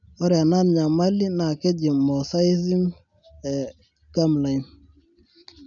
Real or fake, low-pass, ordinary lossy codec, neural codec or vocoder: real; 7.2 kHz; none; none